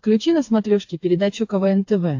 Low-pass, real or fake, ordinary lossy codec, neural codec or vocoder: 7.2 kHz; fake; AAC, 48 kbps; codec, 16 kHz, 4 kbps, FreqCodec, smaller model